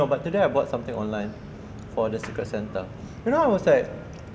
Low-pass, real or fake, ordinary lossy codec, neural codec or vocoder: none; real; none; none